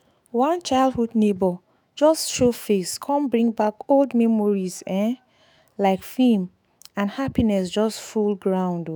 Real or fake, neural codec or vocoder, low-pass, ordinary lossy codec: fake; autoencoder, 48 kHz, 128 numbers a frame, DAC-VAE, trained on Japanese speech; none; none